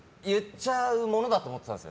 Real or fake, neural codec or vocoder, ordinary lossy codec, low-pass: real; none; none; none